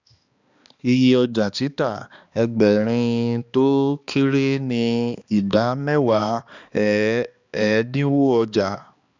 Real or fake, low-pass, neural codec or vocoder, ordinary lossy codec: fake; 7.2 kHz; codec, 16 kHz, 2 kbps, X-Codec, HuBERT features, trained on balanced general audio; Opus, 64 kbps